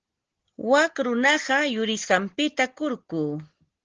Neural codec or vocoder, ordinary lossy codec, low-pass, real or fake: none; Opus, 16 kbps; 7.2 kHz; real